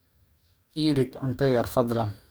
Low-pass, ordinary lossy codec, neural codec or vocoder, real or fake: none; none; codec, 44.1 kHz, 2.6 kbps, DAC; fake